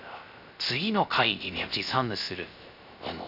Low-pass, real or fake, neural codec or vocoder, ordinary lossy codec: 5.4 kHz; fake; codec, 16 kHz, 0.3 kbps, FocalCodec; none